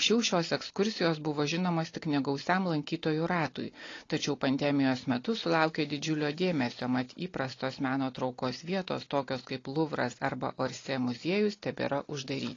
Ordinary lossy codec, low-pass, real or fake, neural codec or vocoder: AAC, 32 kbps; 7.2 kHz; real; none